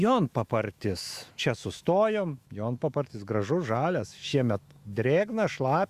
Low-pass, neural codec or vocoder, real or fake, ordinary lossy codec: 14.4 kHz; autoencoder, 48 kHz, 128 numbers a frame, DAC-VAE, trained on Japanese speech; fake; Opus, 64 kbps